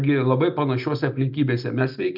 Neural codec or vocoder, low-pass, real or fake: none; 5.4 kHz; real